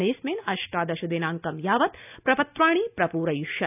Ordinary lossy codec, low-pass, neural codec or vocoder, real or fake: none; 3.6 kHz; none; real